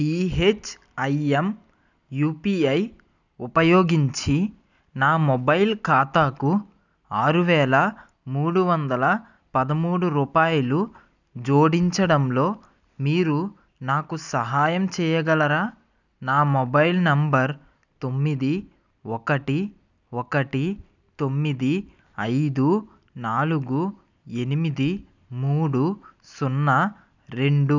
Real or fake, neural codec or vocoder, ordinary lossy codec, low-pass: real; none; none; 7.2 kHz